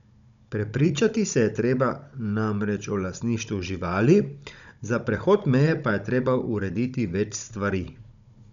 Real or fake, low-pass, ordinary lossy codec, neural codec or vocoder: fake; 7.2 kHz; none; codec, 16 kHz, 16 kbps, FunCodec, trained on Chinese and English, 50 frames a second